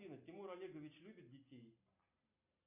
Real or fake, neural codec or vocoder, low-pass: real; none; 3.6 kHz